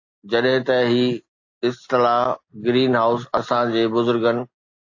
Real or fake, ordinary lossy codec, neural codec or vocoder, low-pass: real; AAC, 48 kbps; none; 7.2 kHz